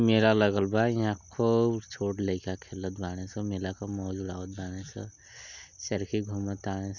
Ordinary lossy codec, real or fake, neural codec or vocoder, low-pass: none; real; none; 7.2 kHz